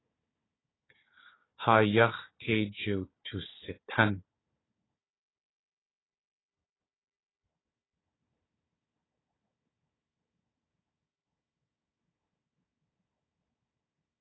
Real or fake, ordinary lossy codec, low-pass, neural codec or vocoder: fake; AAC, 16 kbps; 7.2 kHz; codec, 16 kHz, 4 kbps, FunCodec, trained on Chinese and English, 50 frames a second